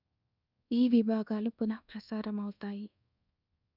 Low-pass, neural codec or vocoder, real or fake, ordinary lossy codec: 5.4 kHz; codec, 24 kHz, 1.2 kbps, DualCodec; fake; none